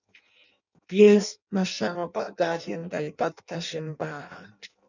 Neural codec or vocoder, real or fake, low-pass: codec, 16 kHz in and 24 kHz out, 0.6 kbps, FireRedTTS-2 codec; fake; 7.2 kHz